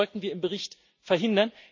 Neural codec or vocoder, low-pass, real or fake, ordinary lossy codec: none; 7.2 kHz; real; MP3, 48 kbps